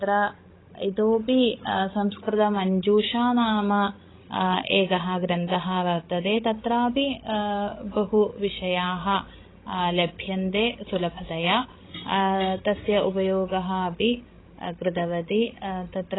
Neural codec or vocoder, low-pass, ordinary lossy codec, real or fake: codec, 16 kHz, 16 kbps, FreqCodec, larger model; 7.2 kHz; AAC, 16 kbps; fake